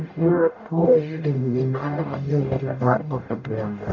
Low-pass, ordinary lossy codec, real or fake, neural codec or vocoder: 7.2 kHz; none; fake; codec, 44.1 kHz, 0.9 kbps, DAC